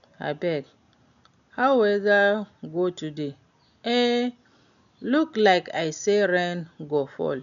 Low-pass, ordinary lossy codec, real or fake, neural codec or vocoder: 7.2 kHz; none; real; none